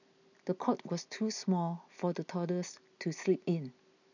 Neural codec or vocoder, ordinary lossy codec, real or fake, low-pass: none; none; real; 7.2 kHz